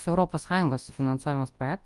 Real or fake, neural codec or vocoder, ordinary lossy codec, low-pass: fake; codec, 24 kHz, 0.9 kbps, WavTokenizer, large speech release; Opus, 32 kbps; 10.8 kHz